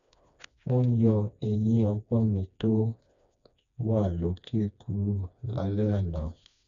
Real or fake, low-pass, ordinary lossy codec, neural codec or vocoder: fake; 7.2 kHz; none; codec, 16 kHz, 2 kbps, FreqCodec, smaller model